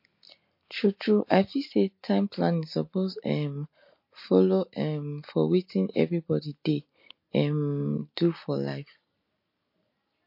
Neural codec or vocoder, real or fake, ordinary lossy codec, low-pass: none; real; MP3, 32 kbps; 5.4 kHz